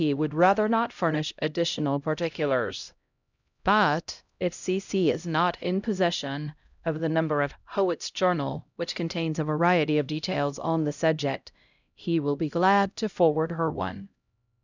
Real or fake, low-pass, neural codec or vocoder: fake; 7.2 kHz; codec, 16 kHz, 0.5 kbps, X-Codec, HuBERT features, trained on LibriSpeech